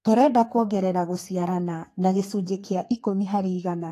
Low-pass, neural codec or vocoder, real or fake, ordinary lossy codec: 14.4 kHz; codec, 32 kHz, 1.9 kbps, SNAC; fake; AAC, 48 kbps